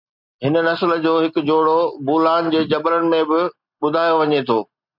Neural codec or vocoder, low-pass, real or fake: none; 5.4 kHz; real